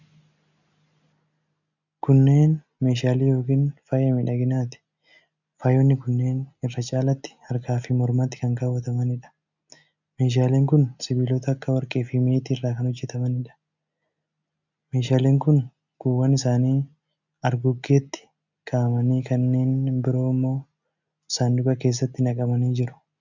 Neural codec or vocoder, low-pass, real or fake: none; 7.2 kHz; real